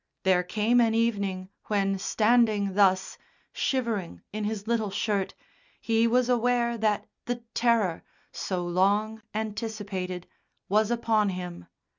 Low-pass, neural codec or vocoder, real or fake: 7.2 kHz; none; real